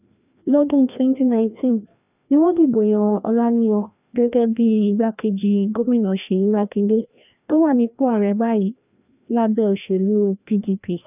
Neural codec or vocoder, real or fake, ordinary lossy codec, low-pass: codec, 16 kHz, 1 kbps, FreqCodec, larger model; fake; none; 3.6 kHz